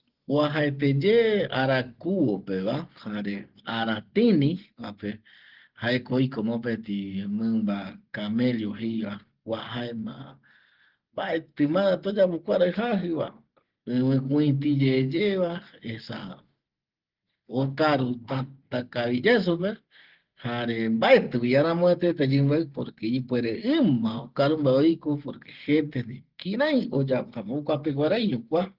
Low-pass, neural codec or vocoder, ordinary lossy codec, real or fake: 5.4 kHz; none; Opus, 16 kbps; real